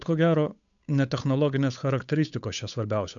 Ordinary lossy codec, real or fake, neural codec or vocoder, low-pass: MP3, 96 kbps; fake; codec, 16 kHz, 8 kbps, FunCodec, trained on Chinese and English, 25 frames a second; 7.2 kHz